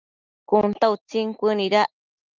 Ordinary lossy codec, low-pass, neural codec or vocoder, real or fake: Opus, 24 kbps; 7.2 kHz; none; real